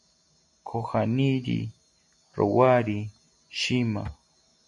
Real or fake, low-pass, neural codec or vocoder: real; 10.8 kHz; none